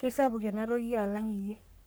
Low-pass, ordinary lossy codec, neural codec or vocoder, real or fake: none; none; codec, 44.1 kHz, 3.4 kbps, Pupu-Codec; fake